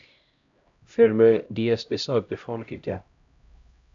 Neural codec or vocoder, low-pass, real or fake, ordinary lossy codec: codec, 16 kHz, 0.5 kbps, X-Codec, HuBERT features, trained on LibriSpeech; 7.2 kHz; fake; AAC, 64 kbps